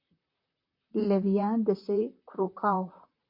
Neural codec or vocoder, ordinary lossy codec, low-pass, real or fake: vocoder, 44.1 kHz, 128 mel bands, Pupu-Vocoder; MP3, 32 kbps; 5.4 kHz; fake